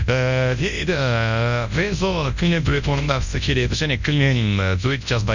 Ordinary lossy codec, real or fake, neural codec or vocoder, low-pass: none; fake; codec, 24 kHz, 0.9 kbps, WavTokenizer, large speech release; 7.2 kHz